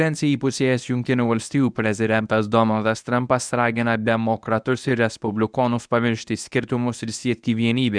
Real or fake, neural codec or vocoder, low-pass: fake; codec, 24 kHz, 0.9 kbps, WavTokenizer, medium speech release version 1; 9.9 kHz